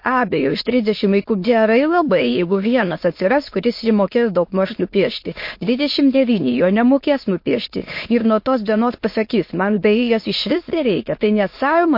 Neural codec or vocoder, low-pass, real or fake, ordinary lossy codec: autoencoder, 22.05 kHz, a latent of 192 numbers a frame, VITS, trained on many speakers; 5.4 kHz; fake; MP3, 32 kbps